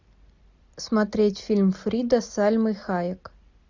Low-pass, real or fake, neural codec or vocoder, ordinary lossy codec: 7.2 kHz; real; none; Opus, 64 kbps